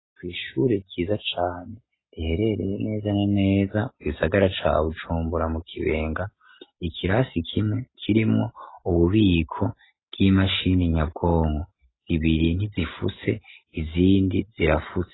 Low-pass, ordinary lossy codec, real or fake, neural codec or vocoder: 7.2 kHz; AAC, 16 kbps; real; none